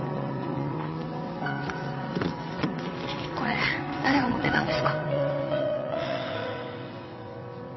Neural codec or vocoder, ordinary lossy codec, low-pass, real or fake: vocoder, 22.05 kHz, 80 mel bands, WaveNeXt; MP3, 24 kbps; 7.2 kHz; fake